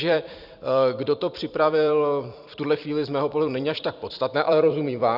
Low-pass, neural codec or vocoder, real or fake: 5.4 kHz; vocoder, 44.1 kHz, 128 mel bands every 256 samples, BigVGAN v2; fake